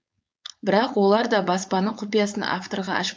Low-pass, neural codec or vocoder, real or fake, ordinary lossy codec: none; codec, 16 kHz, 4.8 kbps, FACodec; fake; none